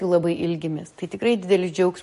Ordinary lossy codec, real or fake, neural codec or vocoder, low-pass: MP3, 48 kbps; real; none; 14.4 kHz